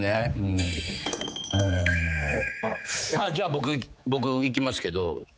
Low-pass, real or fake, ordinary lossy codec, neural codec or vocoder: none; fake; none; codec, 16 kHz, 4 kbps, X-Codec, HuBERT features, trained on general audio